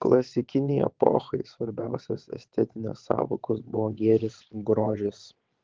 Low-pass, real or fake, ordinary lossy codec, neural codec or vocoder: 7.2 kHz; fake; Opus, 16 kbps; vocoder, 22.05 kHz, 80 mel bands, WaveNeXt